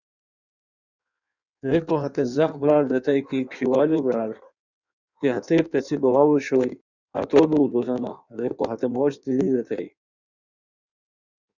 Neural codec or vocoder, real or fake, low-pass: codec, 16 kHz in and 24 kHz out, 1.1 kbps, FireRedTTS-2 codec; fake; 7.2 kHz